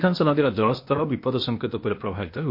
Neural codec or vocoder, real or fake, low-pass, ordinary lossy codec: codec, 16 kHz, 0.8 kbps, ZipCodec; fake; 5.4 kHz; MP3, 32 kbps